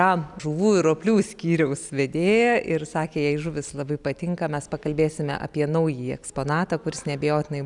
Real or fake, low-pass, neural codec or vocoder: real; 10.8 kHz; none